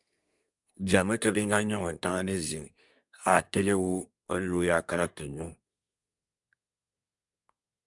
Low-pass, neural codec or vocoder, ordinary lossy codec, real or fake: 10.8 kHz; codec, 24 kHz, 1 kbps, SNAC; MP3, 96 kbps; fake